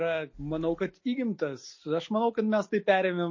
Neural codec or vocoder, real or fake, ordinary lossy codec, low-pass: none; real; MP3, 32 kbps; 7.2 kHz